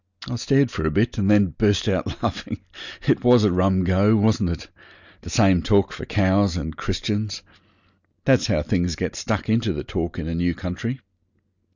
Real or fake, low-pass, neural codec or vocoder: real; 7.2 kHz; none